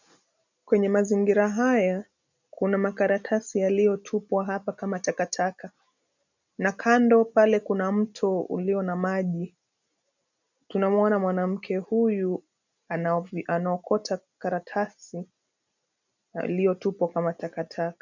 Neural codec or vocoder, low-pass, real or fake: none; 7.2 kHz; real